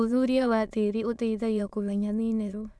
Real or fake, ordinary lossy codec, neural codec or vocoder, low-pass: fake; none; autoencoder, 22.05 kHz, a latent of 192 numbers a frame, VITS, trained on many speakers; none